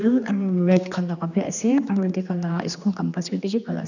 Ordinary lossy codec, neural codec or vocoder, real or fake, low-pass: none; codec, 16 kHz, 2 kbps, X-Codec, HuBERT features, trained on general audio; fake; 7.2 kHz